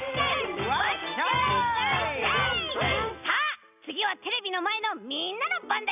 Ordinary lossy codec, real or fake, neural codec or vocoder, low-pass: none; real; none; 3.6 kHz